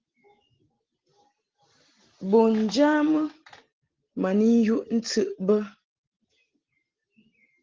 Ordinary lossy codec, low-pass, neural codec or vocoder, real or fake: Opus, 16 kbps; 7.2 kHz; none; real